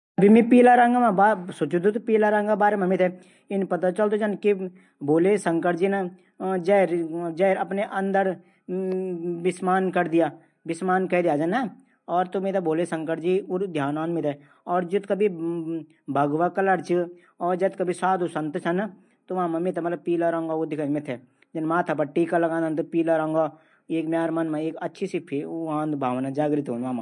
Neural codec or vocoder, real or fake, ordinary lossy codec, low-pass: none; real; MP3, 48 kbps; 10.8 kHz